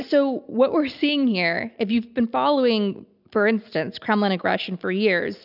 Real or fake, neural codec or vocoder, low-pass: real; none; 5.4 kHz